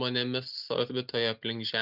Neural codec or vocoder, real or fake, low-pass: none; real; 5.4 kHz